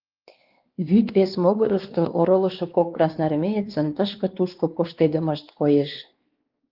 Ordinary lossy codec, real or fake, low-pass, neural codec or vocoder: Opus, 32 kbps; fake; 5.4 kHz; codec, 16 kHz, 2 kbps, X-Codec, WavLM features, trained on Multilingual LibriSpeech